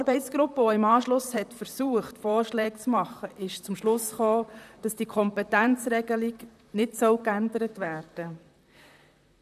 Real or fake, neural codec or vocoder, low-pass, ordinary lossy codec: fake; vocoder, 44.1 kHz, 128 mel bands, Pupu-Vocoder; 14.4 kHz; none